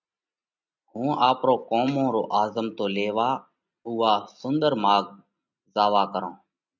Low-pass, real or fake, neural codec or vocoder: 7.2 kHz; real; none